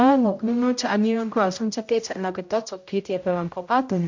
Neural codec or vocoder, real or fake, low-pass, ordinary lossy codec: codec, 16 kHz, 0.5 kbps, X-Codec, HuBERT features, trained on general audio; fake; 7.2 kHz; MP3, 48 kbps